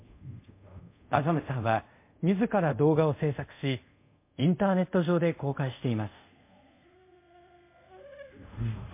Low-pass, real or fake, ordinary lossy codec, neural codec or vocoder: 3.6 kHz; fake; MP3, 24 kbps; codec, 24 kHz, 0.5 kbps, DualCodec